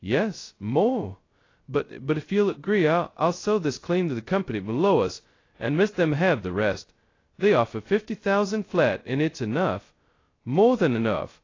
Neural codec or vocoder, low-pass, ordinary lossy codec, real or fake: codec, 16 kHz, 0.2 kbps, FocalCodec; 7.2 kHz; AAC, 32 kbps; fake